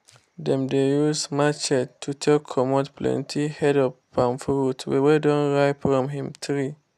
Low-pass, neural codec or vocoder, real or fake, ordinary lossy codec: 14.4 kHz; none; real; none